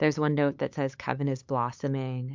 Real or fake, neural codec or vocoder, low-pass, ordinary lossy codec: fake; codec, 16 kHz, 8 kbps, FunCodec, trained on LibriTTS, 25 frames a second; 7.2 kHz; MP3, 64 kbps